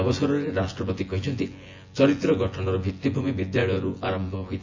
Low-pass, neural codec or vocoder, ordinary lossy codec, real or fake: 7.2 kHz; vocoder, 24 kHz, 100 mel bands, Vocos; AAC, 48 kbps; fake